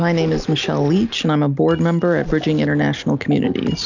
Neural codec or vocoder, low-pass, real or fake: none; 7.2 kHz; real